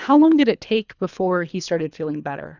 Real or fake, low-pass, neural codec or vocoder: fake; 7.2 kHz; codec, 24 kHz, 3 kbps, HILCodec